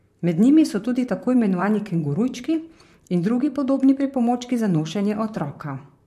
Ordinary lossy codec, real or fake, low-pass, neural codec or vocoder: MP3, 64 kbps; fake; 14.4 kHz; vocoder, 44.1 kHz, 128 mel bands, Pupu-Vocoder